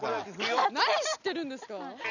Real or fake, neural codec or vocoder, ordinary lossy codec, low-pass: real; none; none; 7.2 kHz